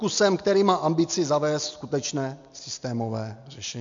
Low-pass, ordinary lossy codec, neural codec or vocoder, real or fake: 7.2 kHz; MP3, 64 kbps; none; real